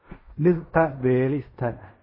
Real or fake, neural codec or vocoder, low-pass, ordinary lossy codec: fake; codec, 16 kHz in and 24 kHz out, 0.4 kbps, LongCat-Audio-Codec, fine tuned four codebook decoder; 5.4 kHz; MP3, 24 kbps